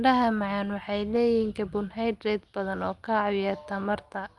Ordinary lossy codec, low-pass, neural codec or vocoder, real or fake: Opus, 32 kbps; 10.8 kHz; none; real